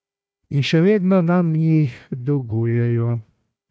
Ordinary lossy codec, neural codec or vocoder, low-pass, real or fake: none; codec, 16 kHz, 1 kbps, FunCodec, trained on Chinese and English, 50 frames a second; none; fake